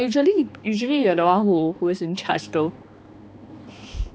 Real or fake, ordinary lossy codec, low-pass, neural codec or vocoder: fake; none; none; codec, 16 kHz, 2 kbps, X-Codec, HuBERT features, trained on balanced general audio